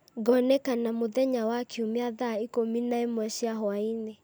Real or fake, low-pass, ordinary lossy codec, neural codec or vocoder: real; none; none; none